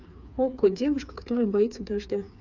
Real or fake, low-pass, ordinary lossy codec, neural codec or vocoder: fake; 7.2 kHz; none; codec, 16 kHz, 4 kbps, FreqCodec, smaller model